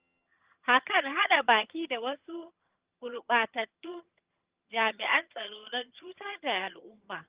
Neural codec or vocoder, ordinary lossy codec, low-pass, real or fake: vocoder, 22.05 kHz, 80 mel bands, HiFi-GAN; Opus, 32 kbps; 3.6 kHz; fake